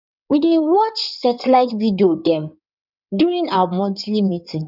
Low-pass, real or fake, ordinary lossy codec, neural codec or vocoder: 5.4 kHz; fake; none; codec, 16 kHz in and 24 kHz out, 2.2 kbps, FireRedTTS-2 codec